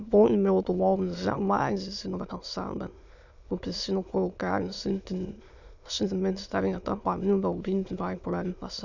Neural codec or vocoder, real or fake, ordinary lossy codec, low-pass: autoencoder, 22.05 kHz, a latent of 192 numbers a frame, VITS, trained on many speakers; fake; none; 7.2 kHz